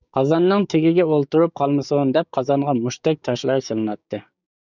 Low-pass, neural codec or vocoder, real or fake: 7.2 kHz; codec, 16 kHz, 6 kbps, DAC; fake